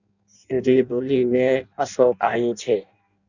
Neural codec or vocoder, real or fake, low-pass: codec, 16 kHz in and 24 kHz out, 0.6 kbps, FireRedTTS-2 codec; fake; 7.2 kHz